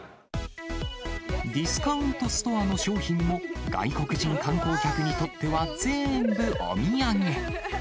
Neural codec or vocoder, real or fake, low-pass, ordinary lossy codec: none; real; none; none